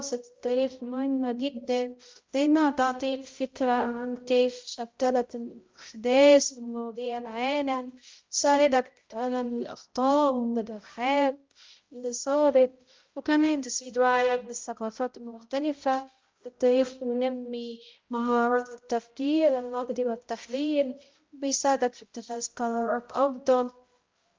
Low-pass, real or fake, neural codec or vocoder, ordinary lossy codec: 7.2 kHz; fake; codec, 16 kHz, 0.5 kbps, X-Codec, HuBERT features, trained on balanced general audio; Opus, 32 kbps